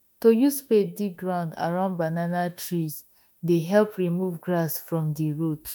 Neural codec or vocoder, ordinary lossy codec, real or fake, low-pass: autoencoder, 48 kHz, 32 numbers a frame, DAC-VAE, trained on Japanese speech; none; fake; 19.8 kHz